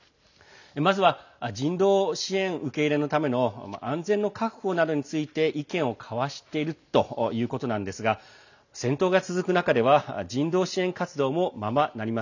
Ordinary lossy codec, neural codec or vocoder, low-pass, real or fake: none; none; 7.2 kHz; real